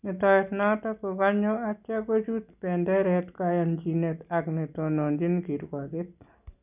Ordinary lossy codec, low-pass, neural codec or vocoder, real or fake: none; 3.6 kHz; none; real